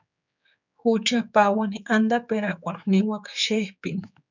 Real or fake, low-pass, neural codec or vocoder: fake; 7.2 kHz; codec, 16 kHz, 4 kbps, X-Codec, HuBERT features, trained on general audio